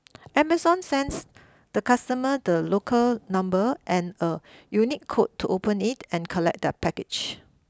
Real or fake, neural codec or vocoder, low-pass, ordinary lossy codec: real; none; none; none